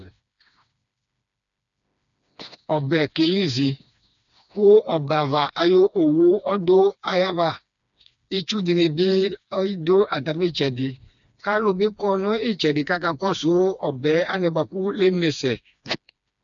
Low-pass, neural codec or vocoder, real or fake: 7.2 kHz; codec, 16 kHz, 2 kbps, FreqCodec, smaller model; fake